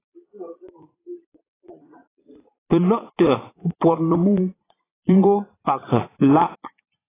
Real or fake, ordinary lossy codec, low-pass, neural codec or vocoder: fake; AAC, 16 kbps; 3.6 kHz; vocoder, 22.05 kHz, 80 mel bands, Vocos